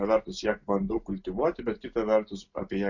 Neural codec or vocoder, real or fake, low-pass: none; real; 7.2 kHz